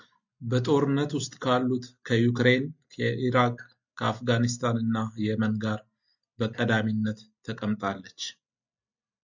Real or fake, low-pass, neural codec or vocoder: real; 7.2 kHz; none